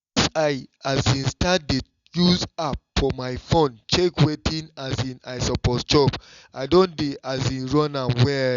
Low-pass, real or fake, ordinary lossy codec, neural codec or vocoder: 7.2 kHz; real; Opus, 64 kbps; none